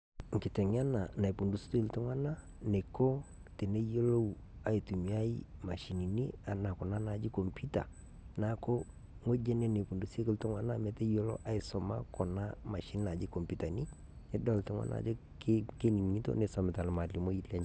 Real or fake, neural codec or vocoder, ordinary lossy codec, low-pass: real; none; none; none